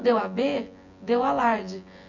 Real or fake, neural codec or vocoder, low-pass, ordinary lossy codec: fake; vocoder, 24 kHz, 100 mel bands, Vocos; 7.2 kHz; none